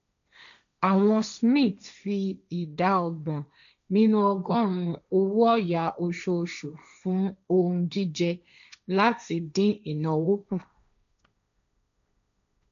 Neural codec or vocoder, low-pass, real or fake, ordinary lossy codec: codec, 16 kHz, 1.1 kbps, Voila-Tokenizer; 7.2 kHz; fake; none